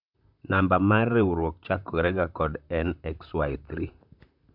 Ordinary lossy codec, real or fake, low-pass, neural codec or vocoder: none; fake; 5.4 kHz; vocoder, 44.1 kHz, 128 mel bands, Pupu-Vocoder